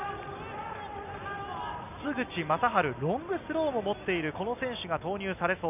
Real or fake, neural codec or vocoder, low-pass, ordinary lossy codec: real; none; 3.6 kHz; none